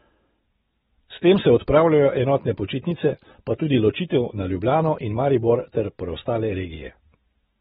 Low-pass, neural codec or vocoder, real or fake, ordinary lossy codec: 19.8 kHz; none; real; AAC, 16 kbps